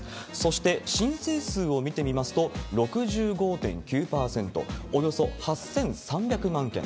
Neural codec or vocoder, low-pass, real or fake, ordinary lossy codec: none; none; real; none